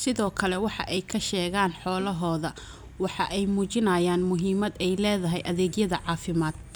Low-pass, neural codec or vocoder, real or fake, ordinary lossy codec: none; none; real; none